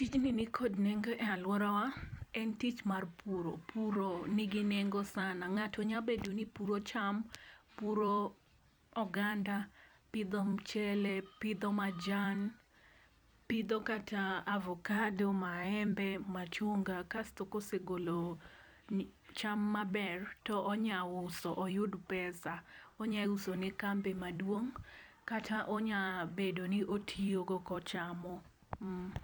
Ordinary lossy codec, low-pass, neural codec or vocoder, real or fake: none; none; vocoder, 44.1 kHz, 128 mel bands every 256 samples, BigVGAN v2; fake